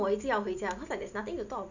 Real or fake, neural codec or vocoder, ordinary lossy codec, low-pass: real; none; none; 7.2 kHz